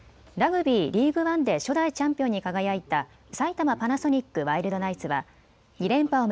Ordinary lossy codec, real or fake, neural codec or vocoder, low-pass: none; real; none; none